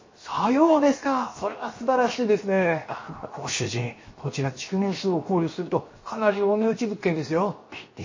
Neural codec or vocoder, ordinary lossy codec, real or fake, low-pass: codec, 16 kHz, 0.7 kbps, FocalCodec; MP3, 32 kbps; fake; 7.2 kHz